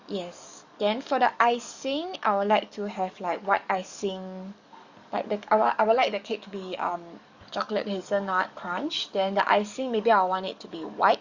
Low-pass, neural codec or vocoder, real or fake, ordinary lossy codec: 7.2 kHz; codec, 44.1 kHz, 7.8 kbps, DAC; fake; Opus, 64 kbps